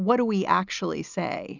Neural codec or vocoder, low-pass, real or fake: none; 7.2 kHz; real